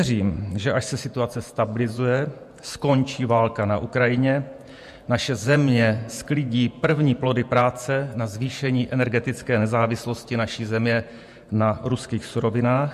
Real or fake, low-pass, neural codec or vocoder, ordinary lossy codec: fake; 14.4 kHz; vocoder, 48 kHz, 128 mel bands, Vocos; MP3, 64 kbps